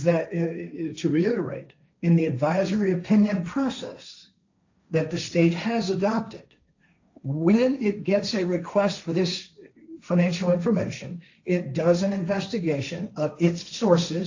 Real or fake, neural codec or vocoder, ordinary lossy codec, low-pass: fake; codec, 16 kHz, 1.1 kbps, Voila-Tokenizer; AAC, 48 kbps; 7.2 kHz